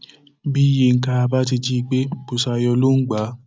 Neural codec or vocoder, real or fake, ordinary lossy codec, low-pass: none; real; none; none